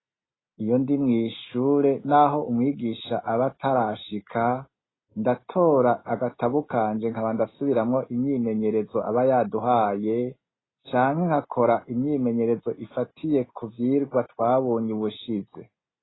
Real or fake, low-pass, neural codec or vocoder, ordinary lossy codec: real; 7.2 kHz; none; AAC, 16 kbps